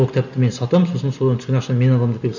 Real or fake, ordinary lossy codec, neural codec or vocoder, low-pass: real; none; none; 7.2 kHz